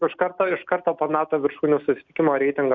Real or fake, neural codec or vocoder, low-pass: real; none; 7.2 kHz